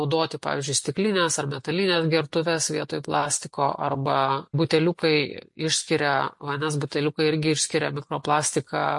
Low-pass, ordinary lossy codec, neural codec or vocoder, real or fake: 10.8 kHz; MP3, 48 kbps; none; real